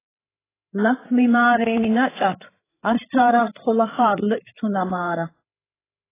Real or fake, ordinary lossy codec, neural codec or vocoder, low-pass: fake; AAC, 16 kbps; codec, 16 kHz, 8 kbps, FreqCodec, larger model; 3.6 kHz